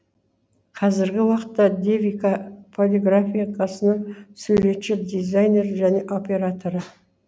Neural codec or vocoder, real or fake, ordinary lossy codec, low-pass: none; real; none; none